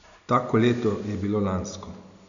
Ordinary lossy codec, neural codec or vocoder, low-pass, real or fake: none; none; 7.2 kHz; real